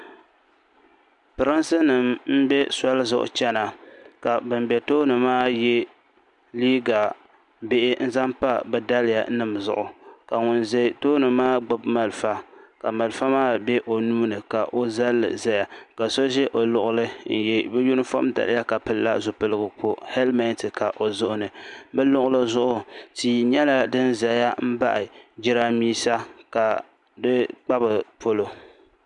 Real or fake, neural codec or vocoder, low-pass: real; none; 10.8 kHz